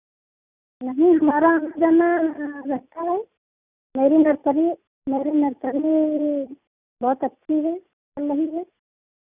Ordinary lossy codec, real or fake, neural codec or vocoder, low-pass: Opus, 64 kbps; real; none; 3.6 kHz